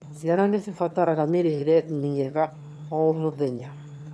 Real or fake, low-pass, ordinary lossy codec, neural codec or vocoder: fake; none; none; autoencoder, 22.05 kHz, a latent of 192 numbers a frame, VITS, trained on one speaker